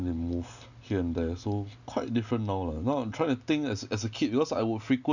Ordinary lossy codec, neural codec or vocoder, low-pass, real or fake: none; none; 7.2 kHz; real